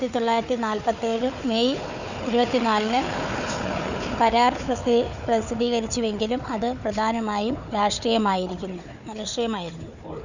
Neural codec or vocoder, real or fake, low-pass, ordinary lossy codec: codec, 16 kHz, 4 kbps, FunCodec, trained on Chinese and English, 50 frames a second; fake; 7.2 kHz; none